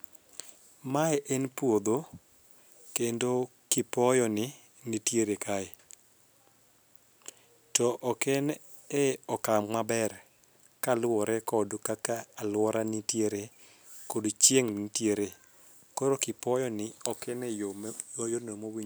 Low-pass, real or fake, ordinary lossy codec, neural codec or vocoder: none; real; none; none